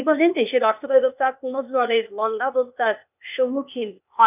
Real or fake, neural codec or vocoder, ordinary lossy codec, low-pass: fake; codec, 16 kHz, 0.8 kbps, ZipCodec; none; 3.6 kHz